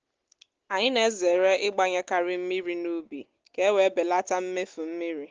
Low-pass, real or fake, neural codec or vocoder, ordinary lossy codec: 7.2 kHz; real; none; Opus, 16 kbps